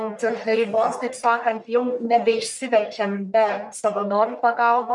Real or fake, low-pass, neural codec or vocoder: fake; 10.8 kHz; codec, 44.1 kHz, 1.7 kbps, Pupu-Codec